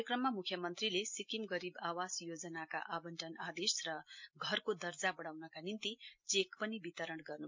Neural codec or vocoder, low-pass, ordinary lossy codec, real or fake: none; 7.2 kHz; MP3, 48 kbps; real